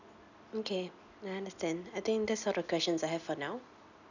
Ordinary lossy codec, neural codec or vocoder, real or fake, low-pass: none; none; real; 7.2 kHz